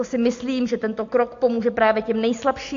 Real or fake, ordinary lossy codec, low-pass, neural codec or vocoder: real; AAC, 48 kbps; 7.2 kHz; none